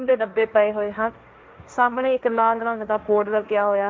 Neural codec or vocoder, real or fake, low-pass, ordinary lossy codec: codec, 16 kHz, 1.1 kbps, Voila-Tokenizer; fake; none; none